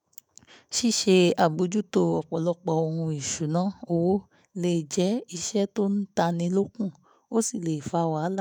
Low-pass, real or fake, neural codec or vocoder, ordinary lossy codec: none; fake; autoencoder, 48 kHz, 128 numbers a frame, DAC-VAE, trained on Japanese speech; none